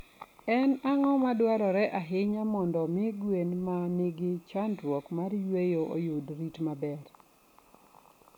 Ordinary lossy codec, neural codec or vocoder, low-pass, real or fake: none; none; 19.8 kHz; real